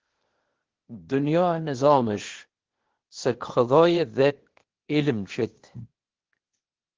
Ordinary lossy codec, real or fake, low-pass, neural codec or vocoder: Opus, 16 kbps; fake; 7.2 kHz; codec, 16 kHz, 0.8 kbps, ZipCodec